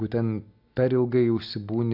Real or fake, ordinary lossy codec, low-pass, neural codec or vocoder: real; AAC, 48 kbps; 5.4 kHz; none